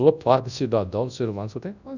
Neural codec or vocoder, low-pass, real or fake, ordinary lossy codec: codec, 24 kHz, 0.9 kbps, WavTokenizer, large speech release; 7.2 kHz; fake; none